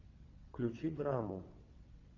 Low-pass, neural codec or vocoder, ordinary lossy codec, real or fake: 7.2 kHz; vocoder, 22.05 kHz, 80 mel bands, WaveNeXt; AAC, 32 kbps; fake